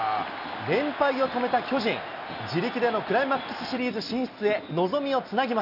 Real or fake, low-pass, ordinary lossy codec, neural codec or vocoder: real; 5.4 kHz; none; none